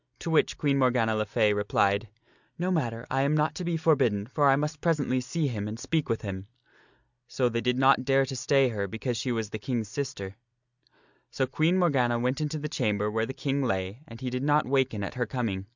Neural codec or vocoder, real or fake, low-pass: none; real; 7.2 kHz